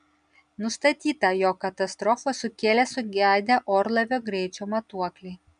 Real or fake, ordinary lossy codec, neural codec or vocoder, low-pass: real; MP3, 96 kbps; none; 9.9 kHz